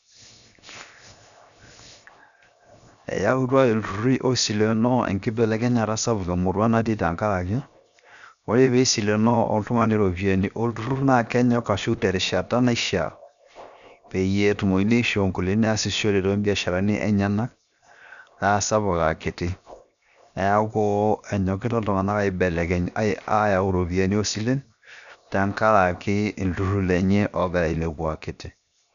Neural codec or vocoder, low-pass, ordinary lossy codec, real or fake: codec, 16 kHz, 0.7 kbps, FocalCodec; 7.2 kHz; none; fake